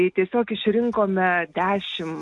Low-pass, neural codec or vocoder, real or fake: 10.8 kHz; none; real